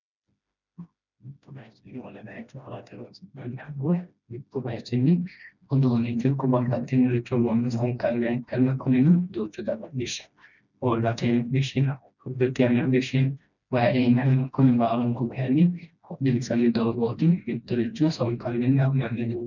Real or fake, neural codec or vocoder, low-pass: fake; codec, 16 kHz, 1 kbps, FreqCodec, smaller model; 7.2 kHz